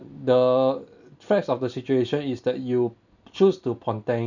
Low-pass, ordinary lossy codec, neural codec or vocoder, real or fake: 7.2 kHz; none; none; real